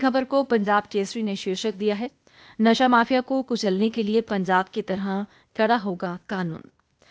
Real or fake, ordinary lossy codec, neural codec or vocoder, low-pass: fake; none; codec, 16 kHz, 0.8 kbps, ZipCodec; none